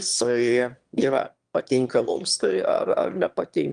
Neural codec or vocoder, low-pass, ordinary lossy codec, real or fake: autoencoder, 22.05 kHz, a latent of 192 numbers a frame, VITS, trained on one speaker; 9.9 kHz; Opus, 32 kbps; fake